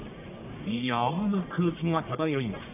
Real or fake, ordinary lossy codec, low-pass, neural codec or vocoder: fake; none; 3.6 kHz; codec, 44.1 kHz, 1.7 kbps, Pupu-Codec